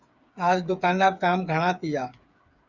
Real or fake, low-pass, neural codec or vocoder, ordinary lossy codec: fake; 7.2 kHz; codec, 16 kHz, 8 kbps, FreqCodec, smaller model; Opus, 64 kbps